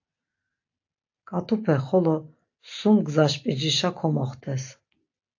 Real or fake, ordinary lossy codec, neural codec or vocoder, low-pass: real; AAC, 48 kbps; none; 7.2 kHz